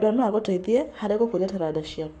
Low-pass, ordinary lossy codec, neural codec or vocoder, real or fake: 10.8 kHz; none; codec, 44.1 kHz, 7.8 kbps, Pupu-Codec; fake